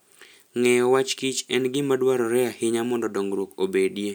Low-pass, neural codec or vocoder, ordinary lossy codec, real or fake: none; none; none; real